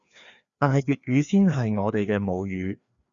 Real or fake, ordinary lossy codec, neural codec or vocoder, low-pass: fake; Opus, 64 kbps; codec, 16 kHz, 2 kbps, FreqCodec, larger model; 7.2 kHz